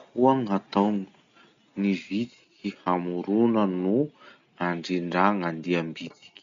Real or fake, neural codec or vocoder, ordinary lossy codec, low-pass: real; none; MP3, 64 kbps; 7.2 kHz